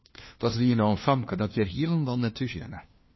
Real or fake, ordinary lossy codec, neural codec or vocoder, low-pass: fake; MP3, 24 kbps; codec, 16 kHz, 1 kbps, FunCodec, trained on LibriTTS, 50 frames a second; 7.2 kHz